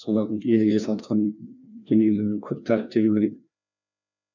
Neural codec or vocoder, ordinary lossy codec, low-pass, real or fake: codec, 16 kHz, 1 kbps, FreqCodec, larger model; none; 7.2 kHz; fake